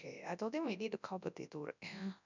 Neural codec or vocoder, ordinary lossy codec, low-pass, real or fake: codec, 24 kHz, 0.9 kbps, WavTokenizer, large speech release; none; 7.2 kHz; fake